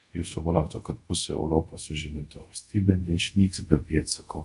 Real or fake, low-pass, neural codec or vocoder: fake; 10.8 kHz; codec, 24 kHz, 0.5 kbps, DualCodec